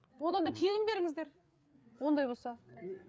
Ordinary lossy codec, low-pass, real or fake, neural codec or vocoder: none; none; fake; codec, 16 kHz, 8 kbps, FreqCodec, larger model